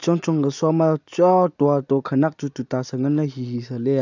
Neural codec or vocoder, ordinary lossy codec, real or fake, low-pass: none; none; real; 7.2 kHz